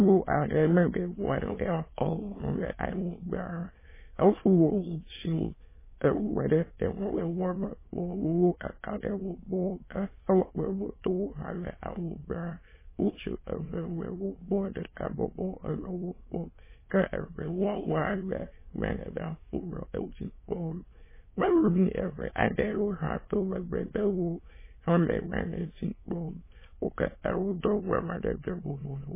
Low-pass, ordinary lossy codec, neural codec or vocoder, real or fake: 3.6 kHz; MP3, 16 kbps; autoencoder, 22.05 kHz, a latent of 192 numbers a frame, VITS, trained on many speakers; fake